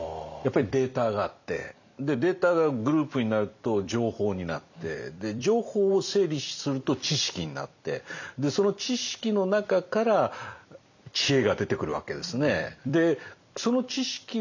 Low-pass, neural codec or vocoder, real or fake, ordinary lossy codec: 7.2 kHz; vocoder, 44.1 kHz, 128 mel bands every 256 samples, BigVGAN v2; fake; none